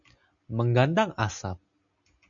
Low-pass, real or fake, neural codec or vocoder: 7.2 kHz; real; none